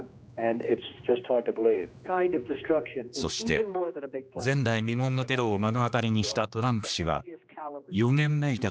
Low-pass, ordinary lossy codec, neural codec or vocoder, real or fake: none; none; codec, 16 kHz, 2 kbps, X-Codec, HuBERT features, trained on general audio; fake